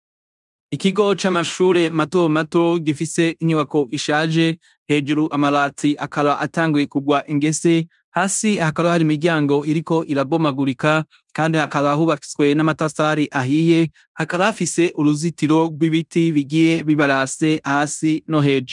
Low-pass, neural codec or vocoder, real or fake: 10.8 kHz; codec, 16 kHz in and 24 kHz out, 0.9 kbps, LongCat-Audio-Codec, fine tuned four codebook decoder; fake